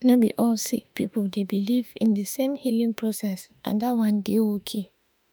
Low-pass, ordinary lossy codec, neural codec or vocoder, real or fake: none; none; autoencoder, 48 kHz, 32 numbers a frame, DAC-VAE, trained on Japanese speech; fake